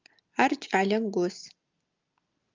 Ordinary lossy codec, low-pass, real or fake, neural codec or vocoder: Opus, 24 kbps; 7.2 kHz; real; none